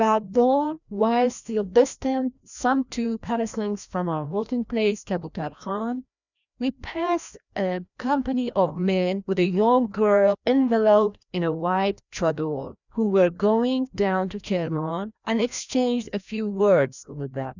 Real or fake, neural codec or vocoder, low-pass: fake; codec, 16 kHz, 1 kbps, FreqCodec, larger model; 7.2 kHz